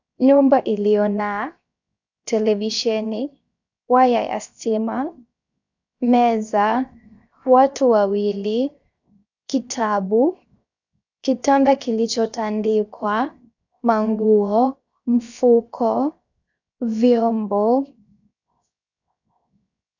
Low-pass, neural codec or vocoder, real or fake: 7.2 kHz; codec, 16 kHz, 0.7 kbps, FocalCodec; fake